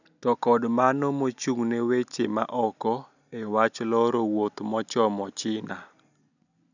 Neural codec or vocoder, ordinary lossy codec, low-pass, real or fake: none; none; 7.2 kHz; real